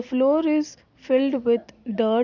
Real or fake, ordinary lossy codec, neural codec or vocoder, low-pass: real; none; none; 7.2 kHz